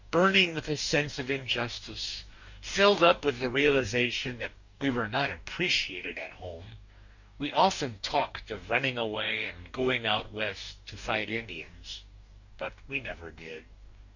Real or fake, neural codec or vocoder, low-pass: fake; codec, 44.1 kHz, 2.6 kbps, DAC; 7.2 kHz